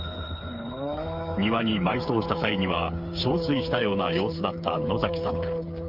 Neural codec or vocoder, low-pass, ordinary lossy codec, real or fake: codec, 16 kHz, 8 kbps, FreqCodec, smaller model; 5.4 kHz; Opus, 24 kbps; fake